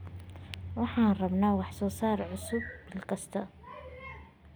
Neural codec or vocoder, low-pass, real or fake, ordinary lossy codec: none; none; real; none